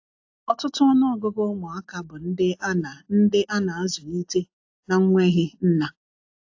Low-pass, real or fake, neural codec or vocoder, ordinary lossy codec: 7.2 kHz; real; none; AAC, 48 kbps